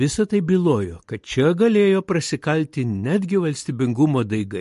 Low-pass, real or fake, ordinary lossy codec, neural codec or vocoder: 14.4 kHz; real; MP3, 48 kbps; none